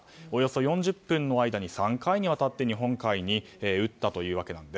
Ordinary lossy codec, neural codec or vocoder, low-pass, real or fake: none; none; none; real